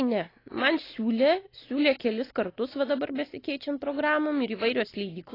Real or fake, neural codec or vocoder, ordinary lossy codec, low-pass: real; none; AAC, 24 kbps; 5.4 kHz